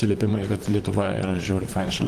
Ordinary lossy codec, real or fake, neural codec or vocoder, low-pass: Opus, 24 kbps; fake; vocoder, 44.1 kHz, 128 mel bands, Pupu-Vocoder; 14.4 kHz